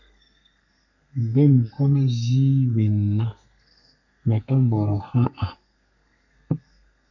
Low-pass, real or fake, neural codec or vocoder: 7.2 kHz; fake; codec, 32 kHz, 1.9 kbps, SNAC